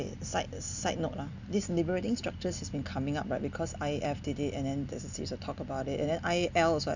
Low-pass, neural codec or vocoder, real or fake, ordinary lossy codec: 7.2 kHz; none; real; none